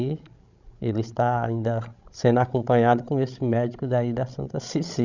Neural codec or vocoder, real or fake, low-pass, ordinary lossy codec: codec, 16 kHz, 16 kbps, FreqCodec, larger model; fake; 7.2 kHz; none